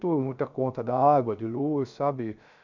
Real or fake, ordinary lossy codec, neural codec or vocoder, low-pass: fake; none; codec, 16 kHz, 0.7 kbps, FocalCodec; 7.2 kHz